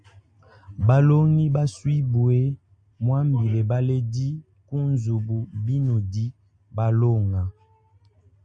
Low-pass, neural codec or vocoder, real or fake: 9.9 kHz; none; real